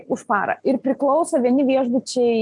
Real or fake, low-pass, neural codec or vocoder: real; 10.8 kHz; none